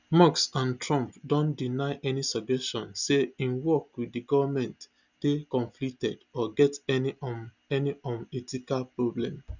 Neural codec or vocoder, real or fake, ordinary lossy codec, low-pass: none; real; none; 7.2 kHz